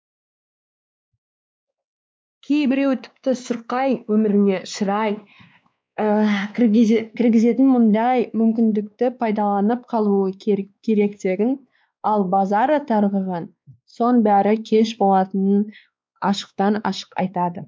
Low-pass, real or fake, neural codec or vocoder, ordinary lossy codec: none; fake; codec, 16 kHz, 2 kbps, X-Codec, WavLM features, trained on Multilingual LibriSpeech; none